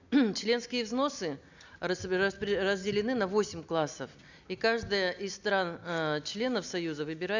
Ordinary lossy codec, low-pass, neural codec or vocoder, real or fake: none; 7.2 kHz; none; real